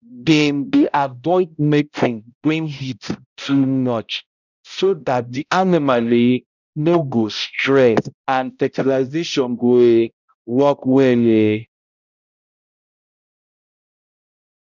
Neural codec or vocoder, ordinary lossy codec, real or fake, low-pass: codec, 16 kHz, 0.5 kbps, X-Codec, HuBERT features, trained on balanced general audio; none; fake; 7.2 kHz